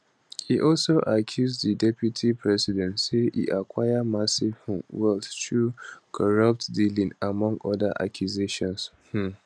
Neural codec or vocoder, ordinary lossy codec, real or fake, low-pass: none; none; real; none